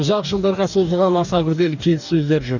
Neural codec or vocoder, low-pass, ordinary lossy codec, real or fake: codec, 44.1 kHz, 2.6 kbps, DAC; 7.2 kHz; none; fake